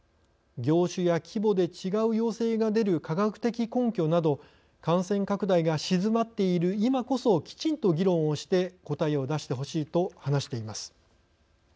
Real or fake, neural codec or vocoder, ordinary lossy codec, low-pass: real; none; none; none